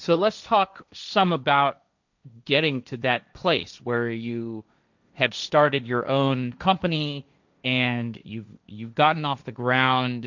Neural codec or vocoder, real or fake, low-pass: codec, 16 kHz, 1.1 kbps, Voila-Tokenizer; fake; 7.2 kHz